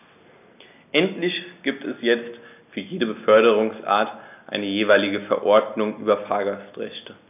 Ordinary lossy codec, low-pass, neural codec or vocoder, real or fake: none; 3.6 kHz; none; real